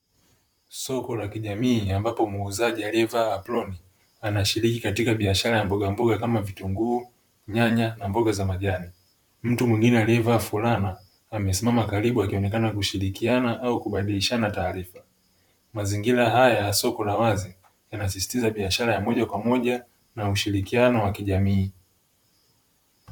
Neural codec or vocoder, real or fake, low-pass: vocoder, 44.1 kHz, 128 mel bands, Pupu-Vocoder; fake; 19.8 kHz